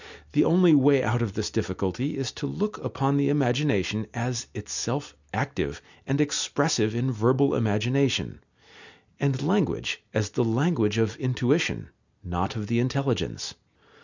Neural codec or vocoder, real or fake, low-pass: none; real; 7.2 kHz